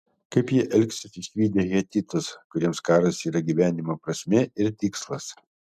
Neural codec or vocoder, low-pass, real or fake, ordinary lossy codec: none; 14.4 kHz; real; MP3, 96 kbps